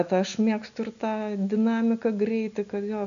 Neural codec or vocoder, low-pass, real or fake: none; 7.2 kHz; real